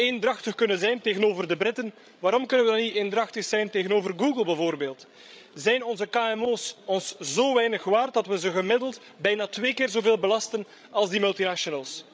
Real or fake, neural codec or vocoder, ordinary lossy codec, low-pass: fake; codec, 16 kHz, 16 kbps, FreqCodec, larger model; none; none